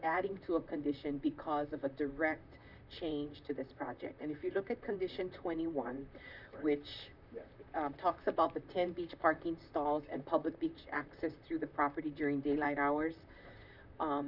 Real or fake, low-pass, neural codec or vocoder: fake; 5.4 kHz; vocoder, 44.1 kHz, 128 mel bands, Pupu-Vocoder